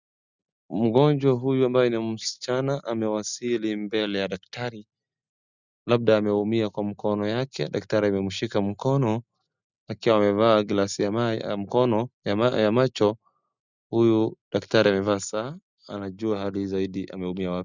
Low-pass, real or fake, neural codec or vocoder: 7.2 kHz; real; none